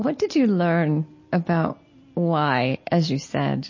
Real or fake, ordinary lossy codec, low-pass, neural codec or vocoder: real; MP3, 32 kbps; 7.2 kHz; none